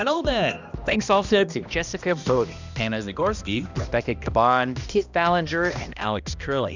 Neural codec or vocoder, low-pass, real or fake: codec, 16 kHz, 1 kbps, X-Codec, HuBERT features, trained on balanced general audio; 7.2 kHz; fake